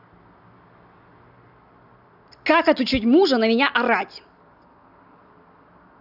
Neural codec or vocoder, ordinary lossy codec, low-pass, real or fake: none; none; 5.4 kHz; real